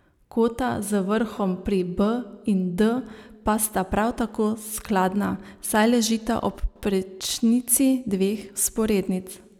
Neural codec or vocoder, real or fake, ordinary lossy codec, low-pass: none; real; none; 19.8 kHz